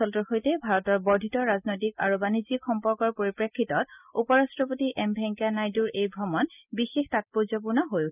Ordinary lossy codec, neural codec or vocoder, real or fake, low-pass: none; none; real; 3.6 kHz